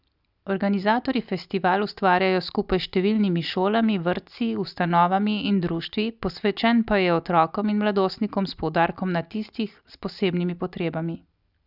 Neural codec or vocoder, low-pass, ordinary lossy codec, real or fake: none; 5.4 kHz; Opus, 64 kbps; real